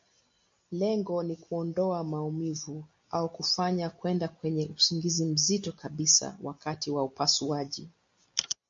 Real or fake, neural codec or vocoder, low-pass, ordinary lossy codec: real; none; 7.2 kHz; MP3, 48 kbps